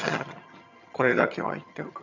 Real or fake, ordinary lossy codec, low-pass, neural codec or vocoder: fake; none; 7.2 kHz; vocoder, 22.05 kHz, 80 mel bands, HiFi-GAN